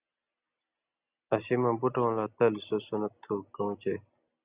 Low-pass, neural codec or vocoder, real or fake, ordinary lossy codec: 3.6 kHz; none; real; Opus, 64 kbps